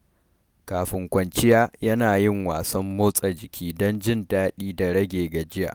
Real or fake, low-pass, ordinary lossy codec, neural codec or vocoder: real; none; none; none